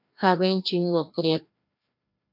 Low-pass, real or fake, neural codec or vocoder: 5.4 kHz; fake; codec, 16 kHz, 1 kbps, FreqCodec, larger model